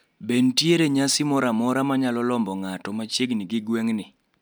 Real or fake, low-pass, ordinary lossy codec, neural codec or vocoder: real; none; none; none